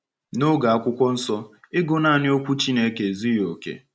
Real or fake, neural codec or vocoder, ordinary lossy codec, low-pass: real; none; none; none